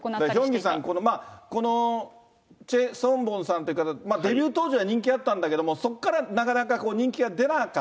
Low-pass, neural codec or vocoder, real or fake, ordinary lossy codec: none; none; real; none